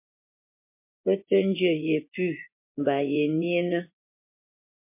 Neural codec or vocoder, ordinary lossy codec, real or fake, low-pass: vocoder, 24 kHz, 100 mel bands, Vocos; MP3, 24 kbps; fake; 3.6 kHz